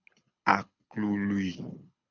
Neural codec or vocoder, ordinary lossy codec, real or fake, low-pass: codec, 24 kHz, 6 kbps, HILCodec; MP3, 64 kbps; fake; 7.2 kHz